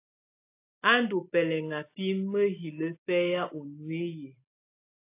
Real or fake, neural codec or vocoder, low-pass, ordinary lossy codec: real; none; 3.6 kHz; AAC, 24 kbps